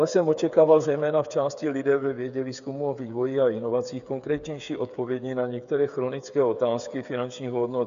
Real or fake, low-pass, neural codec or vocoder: fake; 7.2 kHz; codec, 16 kHz, 8 kbps, FreqCodec, smaller model